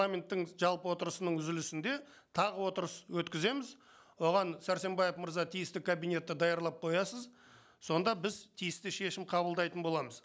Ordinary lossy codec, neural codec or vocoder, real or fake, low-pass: none; none; real; none